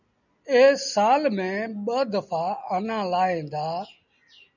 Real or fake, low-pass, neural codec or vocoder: real; 7.2 kHz; none